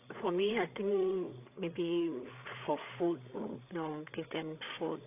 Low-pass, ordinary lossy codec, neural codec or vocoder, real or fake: 3.6 kHz; AAC, 24 kbps; codec, 16 kHz, 8 kbps, FreqCodec, larger model; fake